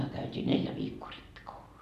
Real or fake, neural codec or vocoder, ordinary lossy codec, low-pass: real; none; none; 14.4 kHz